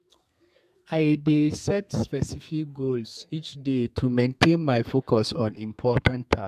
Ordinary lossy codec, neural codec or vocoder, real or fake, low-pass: AAC, 96 kbps; codec, 32 kHz, 1.9 kbps, SNAC; fake; 14.4 kHz